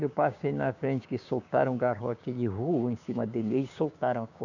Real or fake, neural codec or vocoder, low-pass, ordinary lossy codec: fake; codec, 16 kHz, 6 kbps, DAC; 7.2 kHz; none